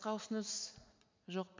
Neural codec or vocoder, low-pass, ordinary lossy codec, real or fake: vocoder, 44.1 kHz, 80 mel bands, Vocos; 7.2 kHz; MP3, 64 kbps; fake